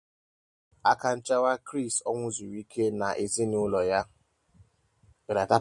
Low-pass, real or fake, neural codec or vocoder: 10.8 kHz; real; none